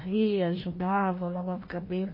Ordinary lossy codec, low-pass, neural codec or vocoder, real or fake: MP3, 24 kbps; 5.4 kHz; codec, 16 kHz, 1 kbps, FreqCodec, larger model; fake